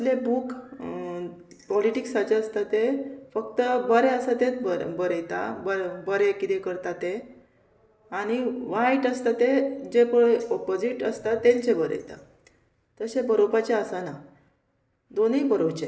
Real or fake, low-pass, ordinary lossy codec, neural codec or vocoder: real; none; none; none